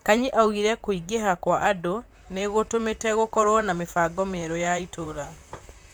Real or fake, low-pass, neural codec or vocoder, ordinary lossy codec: fake; none; vocoder, 44.1 kHz, 128 mel bands, Pupu-Vocoder; none